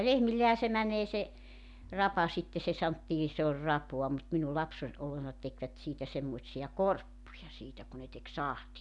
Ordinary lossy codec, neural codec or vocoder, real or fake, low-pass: none; none; real; 10.8 kHz